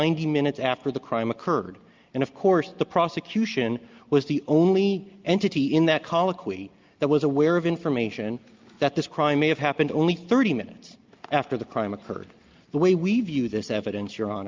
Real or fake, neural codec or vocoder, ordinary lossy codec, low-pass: real; none; Opus, 24 kbps; 7.2 kHz